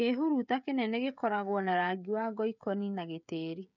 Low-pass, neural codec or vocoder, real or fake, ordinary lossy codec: 7.2 kHz; codec, 16 kHz, 16 kbps, FreqCodec, smaller model; fake; none